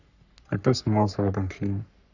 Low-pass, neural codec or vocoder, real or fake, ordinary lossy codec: 7.2 kHz; codec, 44.1 kHz, 3.4 kbps, Pupu-Codec; fake; none